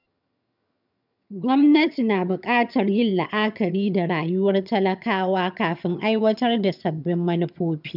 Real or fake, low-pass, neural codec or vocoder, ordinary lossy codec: fake; 5.4 kHz; vocoder, 22.05 kHz, 80 mel bands, HiFi-GAN; none